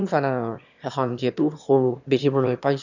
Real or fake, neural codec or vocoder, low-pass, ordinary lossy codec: fake; autoencoder, 22.05 kHz, a latent of 192 numbers a frame, VITS, trained on one speaker; 7.2 kHz; none